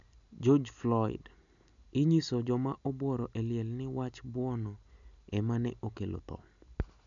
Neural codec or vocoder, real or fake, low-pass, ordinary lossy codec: none; real; 7.2 kHz; none